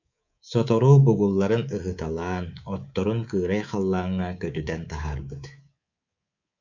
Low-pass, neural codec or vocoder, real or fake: 7.2 kHz; codec, 24 kHz, 3.1 kbps, DualCodec; fake